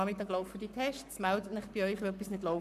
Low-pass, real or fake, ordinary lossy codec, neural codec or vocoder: 14.4 kHz; fake; none; codec, 44.1 kHz, 7.8 kbps, Pupu-Codec